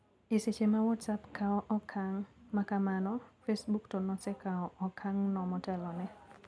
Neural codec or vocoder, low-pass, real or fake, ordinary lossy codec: none; none; real; none